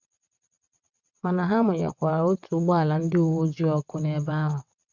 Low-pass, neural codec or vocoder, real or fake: 7.2 kHz; vocoder, 22.05 kHz, 80 mel bands, WaveNeXt; fake